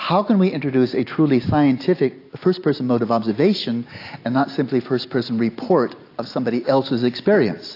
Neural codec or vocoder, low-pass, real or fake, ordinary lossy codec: none; 5.4 kHz; real; AAC, 32 kbps